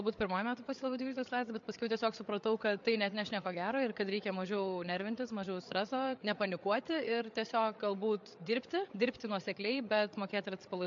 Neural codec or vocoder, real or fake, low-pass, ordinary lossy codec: codec, 16 kHz, 8 kbps, FreqCodec, larger model; fake; 7.2 kHz; MP3, 48 kbps